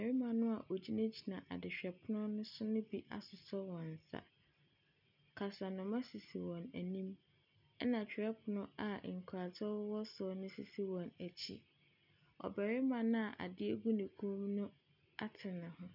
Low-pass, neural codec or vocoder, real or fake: 5.4 kHz; none; real